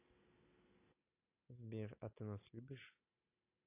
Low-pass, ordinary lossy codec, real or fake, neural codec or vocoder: 3.6 kHz; AAC, 24 kbps; real; none